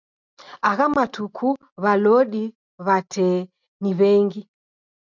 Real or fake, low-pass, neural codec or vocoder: real; 7.2 kHz; none